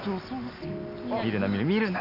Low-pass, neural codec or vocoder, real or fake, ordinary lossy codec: 5.4 kHz; none; real; none